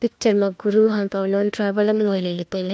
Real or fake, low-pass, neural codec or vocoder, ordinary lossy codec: fake; none; codec, 16 kHz, 1 kbps, FunCodec, trained on LibriTTS, 50 frames a second; none